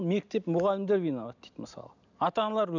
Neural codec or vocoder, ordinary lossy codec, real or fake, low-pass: none; none; real; 7.2 kHz